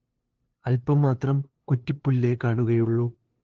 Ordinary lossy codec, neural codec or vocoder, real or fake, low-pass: Opus, 16 kbps; codec, 16 kHz, 2 kbps, FunCodec, trained on LibriTTS, 25 frames a second; fake; 7.2 kHz